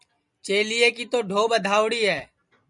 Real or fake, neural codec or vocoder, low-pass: real; none; 10.8 kHz